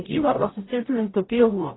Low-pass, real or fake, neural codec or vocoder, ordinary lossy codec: 7.2 kHz; fake; codec, 44.1 kHz, 0.9 kbps, DAC; AAC, 16 kbps